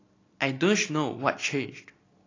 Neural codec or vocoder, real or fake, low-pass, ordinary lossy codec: none; real; 7.2 kHz; AAC, 32 kbps